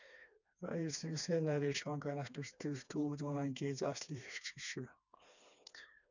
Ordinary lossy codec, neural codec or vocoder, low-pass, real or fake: none; codec, 16 kHz, 2 kbps, FreqCodec, smaller model; 7.2 kHz; fake